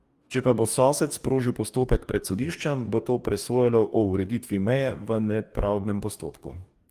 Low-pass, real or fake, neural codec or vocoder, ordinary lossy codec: 14.4 kHz; fake; codec, 44.1 kHz, 2.6 kbps, DAC; Opus, 32 kbps